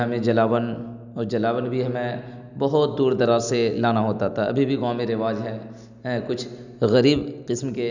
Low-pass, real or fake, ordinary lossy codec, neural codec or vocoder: 7.2 kHz; real; none; none